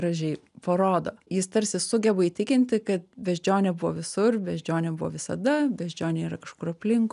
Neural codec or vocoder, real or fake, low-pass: none; real; 10.8 kHz